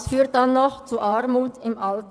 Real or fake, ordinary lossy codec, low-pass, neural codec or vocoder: fake; none; none; vocoder, 22.05 kHz, 80 mel bands, WaveNeXt